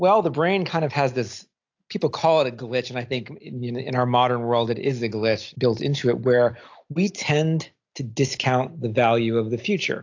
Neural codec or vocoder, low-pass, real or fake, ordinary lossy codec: none; 7.2 kHz; real; AAC, 48 kbps